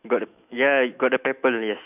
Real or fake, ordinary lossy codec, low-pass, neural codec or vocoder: fake; none; 3.6 kHz; autoencoder, 48 kHz, 128 numbers a frame, DAC-VAE, trained on Japanese speech